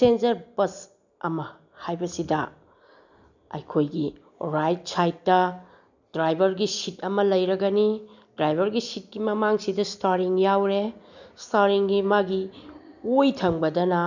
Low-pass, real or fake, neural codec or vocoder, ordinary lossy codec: 7.2 kHz; real; none; none